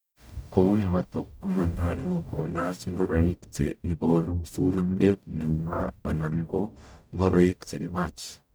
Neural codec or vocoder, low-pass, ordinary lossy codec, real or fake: codec, 44.1 kHz, 0.9 kbps, DAC; none; none; fake